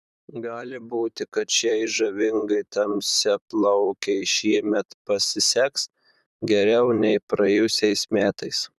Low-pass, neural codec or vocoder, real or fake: 14.4 kHz; vocoder, 44.1 kHz, 128 mel bands, Pupu-Vocoder; fake